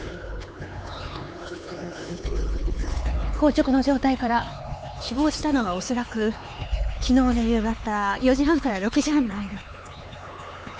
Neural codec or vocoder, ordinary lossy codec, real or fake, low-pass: codec, 16 kHz, 4 kbps, X-Codec, HuBERT features, trained on LibriSpeech; none; fake; none